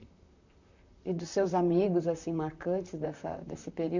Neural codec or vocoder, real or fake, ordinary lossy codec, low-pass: vocoder, 44.1 kHz, 128 mel bands, Pupu-Vocoder; fake; none; 7.2 kHz